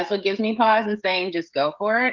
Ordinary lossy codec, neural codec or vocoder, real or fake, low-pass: Opus, 32 kbps; codec, 16 kHz, 16 kbps, FreqCodec, smaller model; fake; 7.2 kHz